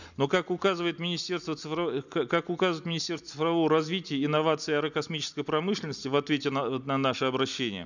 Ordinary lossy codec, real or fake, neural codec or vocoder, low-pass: none; real; none; 7.2 kHz